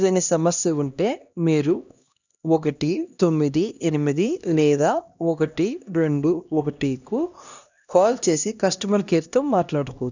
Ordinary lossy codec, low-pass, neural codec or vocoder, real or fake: none; 7.2 kHz; codec, 16 kHz, 1 kbps, X-Codec, HuBERT features, trained on LibriSpeech; fake